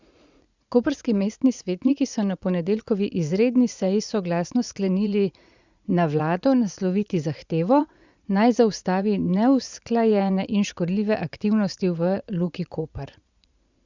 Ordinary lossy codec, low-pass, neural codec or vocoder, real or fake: Opus, 64 kbps; 7.2 kHz; vocoder, 44.1 kHz, 80 mel bands, Vocos; fake